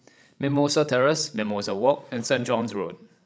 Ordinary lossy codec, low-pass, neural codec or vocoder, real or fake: none; none; codec, 16 kHz, 16 kbps, FreqCodec, larger model; fake